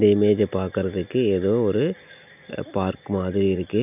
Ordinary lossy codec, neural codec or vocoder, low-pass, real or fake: none; none; 3.6 kHz; real